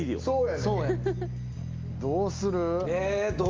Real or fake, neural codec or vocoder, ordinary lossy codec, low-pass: real; none; Opus, 24 kbps; 7.2 kHz